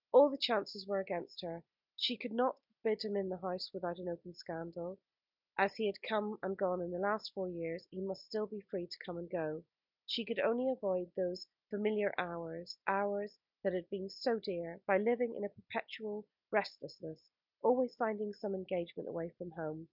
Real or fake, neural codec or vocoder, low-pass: real; none; 5.4 kHz